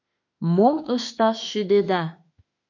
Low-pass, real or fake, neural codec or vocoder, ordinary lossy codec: 7.2 kHz; fake; autoencoder, 48 kHz, 32 numbers a frame, DAC-VAE, trained on Japanese speech; MP3, 48 kbps